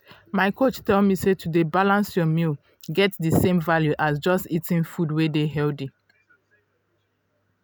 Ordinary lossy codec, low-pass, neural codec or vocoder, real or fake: none; none; none; real